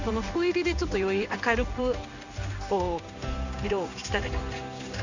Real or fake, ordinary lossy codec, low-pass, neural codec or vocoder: fake; none; 7.2 kHz; codec, 16 kHz in and 24 kHz out, 1 kbps, XY-Tokenizer